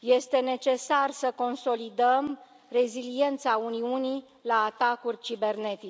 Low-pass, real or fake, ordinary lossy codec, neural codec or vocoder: none; real; none; none